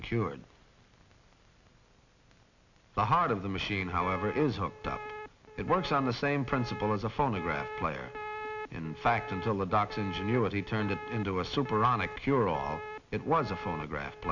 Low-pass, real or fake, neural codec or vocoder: 7.2 kHz; real; none